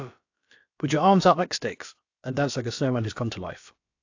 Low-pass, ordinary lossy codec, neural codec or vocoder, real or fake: 7.2 kHz; AAC, 48 kbps; codec, 16 kHz, about 1 kbps, DyCAST, with the encoder's durations; fake